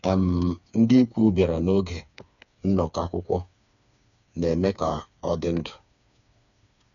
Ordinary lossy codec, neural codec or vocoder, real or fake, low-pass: none; codec, 16 kHz, 4 kbps, FreqCodec, smaller model; fake; 7.2 kHz